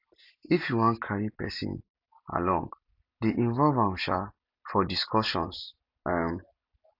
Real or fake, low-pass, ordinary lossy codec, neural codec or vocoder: real; 5.4 kHz; none; none